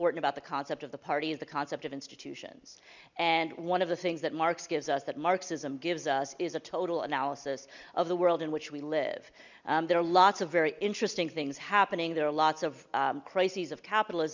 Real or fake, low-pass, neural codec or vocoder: real; 7.2 kHz; none